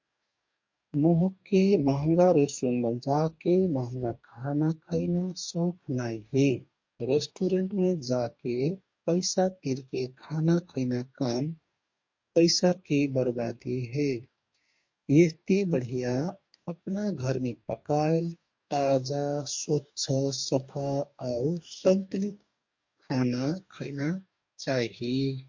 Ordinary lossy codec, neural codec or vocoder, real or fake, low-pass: MP3, 48 kbps; codec, 44.1 kHz, 2.6 kbps, DAC; fake; 7.2 kHz